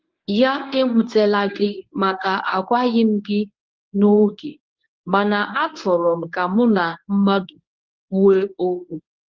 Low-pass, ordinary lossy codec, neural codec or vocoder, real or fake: 7.2 kHz; Opus, 32 kbps; codec, 24 kHz, 0.9 kbps, WavTokenizer, medium speech release version 1; fake